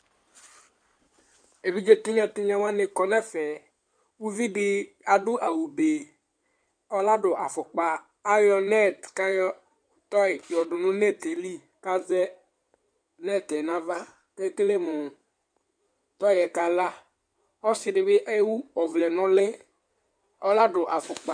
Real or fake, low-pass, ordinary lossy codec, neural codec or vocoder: fake; 9.9 kHz; MP3, 96 kbps; codec, 16 kHz in and 24 kHz out, 2.2 kbps, FireRedTTS-2 codec